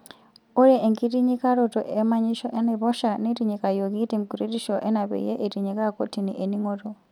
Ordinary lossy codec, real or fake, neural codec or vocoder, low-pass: none; real; none; 19.8 kHz